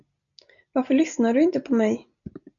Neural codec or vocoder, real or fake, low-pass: none; real; 7.2 kHz